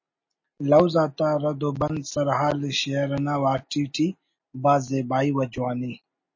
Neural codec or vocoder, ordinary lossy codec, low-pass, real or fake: none; MP3, 32 kbps; 7.2 kHz; real